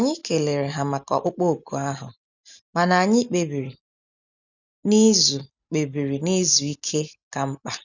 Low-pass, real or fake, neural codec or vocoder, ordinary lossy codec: 7.2 kHz; real; none; none